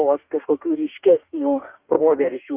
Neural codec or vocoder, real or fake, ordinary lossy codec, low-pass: codec, 44.1 kHz, 2.6 kbps, DAC; fake; Opus, 24 kbps; 3.6 kHz